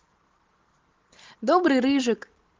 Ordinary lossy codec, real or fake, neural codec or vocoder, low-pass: Opus, 16 kbps; real; none; 7.2 kHz